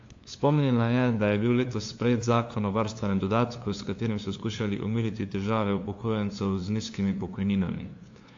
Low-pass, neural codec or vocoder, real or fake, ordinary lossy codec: 7.2 kHz; codec, 16 kHz, 2 kbps, FunCodec, trained on LibriTTS, 25 frames a second; fake; AAC, 48 kbps